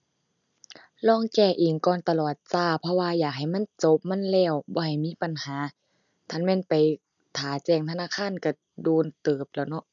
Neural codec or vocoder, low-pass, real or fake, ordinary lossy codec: none; 7.2 kHz; real; none